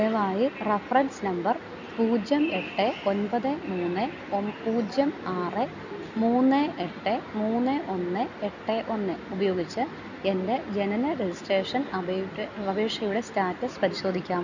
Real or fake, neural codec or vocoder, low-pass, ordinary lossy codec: real; none; 7.2 kHz; none